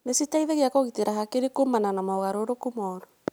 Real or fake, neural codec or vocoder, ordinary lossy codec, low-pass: real; none; none; none